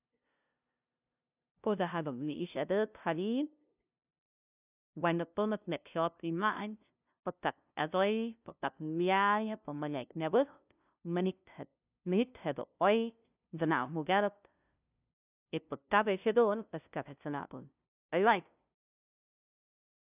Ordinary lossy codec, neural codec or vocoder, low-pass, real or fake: none; codec, 16 kHz, 0.5 kbps, FunCodec, trained on LibriTTS, 25 frames a second; 3.6 kHz; fake